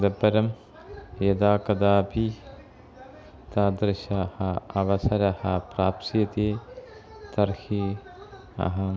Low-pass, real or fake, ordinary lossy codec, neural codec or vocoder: none; real; none; none